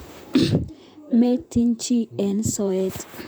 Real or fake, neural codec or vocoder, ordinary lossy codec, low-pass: fake; vocoder, 44.1 kHz, 128 mel bands, Pupu-Vocoder; none; none